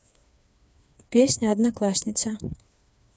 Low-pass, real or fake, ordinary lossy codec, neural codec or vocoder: none; fake; none; codec, 16 kHz, 4 kbps, FreqCodec, smaller model